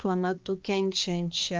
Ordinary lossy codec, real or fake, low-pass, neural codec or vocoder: Opus, 24 kbps; fake; 7.2 kHz; codec, 16 kHz, 2 kbps, X-Codec, HuBERT features, trained on balanced general audio